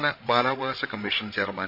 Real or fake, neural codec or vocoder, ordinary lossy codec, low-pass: fake; codec, 16 kHz, 16 kbps, FreqCodec, larger model; MP3, 48 kbps; 5.4 kHz